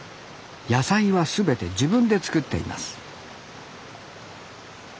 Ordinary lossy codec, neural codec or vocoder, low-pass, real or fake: none; none; none; real